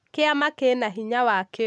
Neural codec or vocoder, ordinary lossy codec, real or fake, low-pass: none; none; real; none